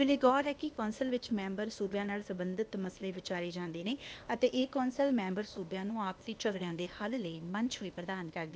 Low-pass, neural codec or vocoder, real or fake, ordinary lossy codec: none; codec, 16 kHz, 0.8 kbps, ZipCodec; fake; none